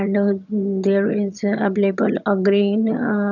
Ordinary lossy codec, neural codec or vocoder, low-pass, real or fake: none; vocoder, 22.05 kHz, 80 mel bands, HiFi-GAN; 7.2 kHz; fake